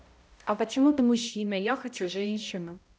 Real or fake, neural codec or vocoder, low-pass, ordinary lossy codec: fake; codec, 16 kHz, 0.5 kbps, X-Codec, HuBERT features, trained on balanced general audio; none; none